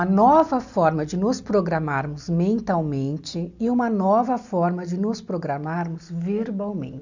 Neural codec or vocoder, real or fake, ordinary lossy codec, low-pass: none; real; none; 7.2 kHz